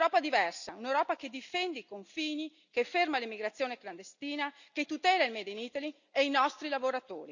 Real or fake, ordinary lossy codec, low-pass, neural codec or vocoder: real; none; 7.2 kHz; none